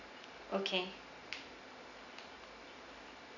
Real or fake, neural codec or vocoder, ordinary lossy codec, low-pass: real; none; none; 7.2 kHz